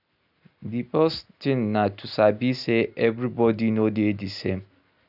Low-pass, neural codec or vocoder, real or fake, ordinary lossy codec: 5.4 kHz; none; real; none